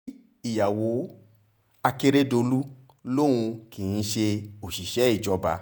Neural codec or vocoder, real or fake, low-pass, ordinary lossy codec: vocoder, 48 kHz, 128 mel bands, Vocos; fake; none; none